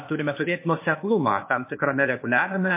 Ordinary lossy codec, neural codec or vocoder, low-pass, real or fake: MP3, 24 kbps; codec, 16 kHz, 0.8 kbps, ZipCodec; 3.6 kHz; fake